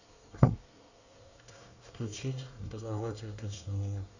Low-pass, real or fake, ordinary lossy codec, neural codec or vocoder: 7.2 kHz; fake; none; codec, 24 kHz, 1 kbps, SNAC